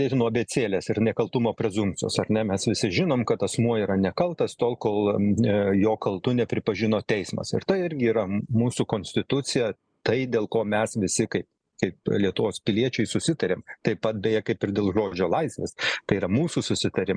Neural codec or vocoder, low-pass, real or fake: none; 9.9 kHz; real